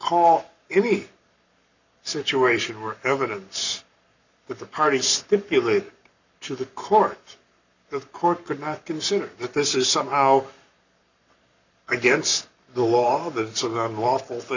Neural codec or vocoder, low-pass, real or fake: codec, 44.1 kHz, 7.8 kbps, Pupu-Codec; 7.2 kHz; fake